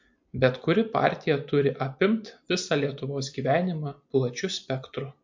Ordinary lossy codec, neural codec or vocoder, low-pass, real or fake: MP3, 48 kbps; none; 7.2 kHz; real